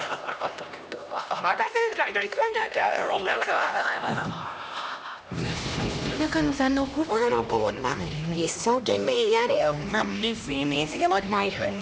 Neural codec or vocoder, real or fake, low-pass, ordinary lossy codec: codec, 16 kHz, 1 kbps, X-Codec, HuBERT features, trained on LibriSpeech; fake; none; none